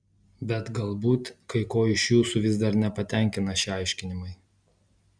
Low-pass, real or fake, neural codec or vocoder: 9.9 kHz; real; none